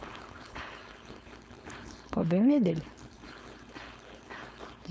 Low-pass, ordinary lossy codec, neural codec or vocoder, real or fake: none; none; codec, 16 kHz, 4.8 kbps, FACodec; fake